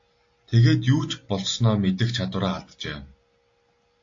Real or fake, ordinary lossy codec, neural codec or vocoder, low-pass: real; AAC, 48 kbps; none; 7.2 kHz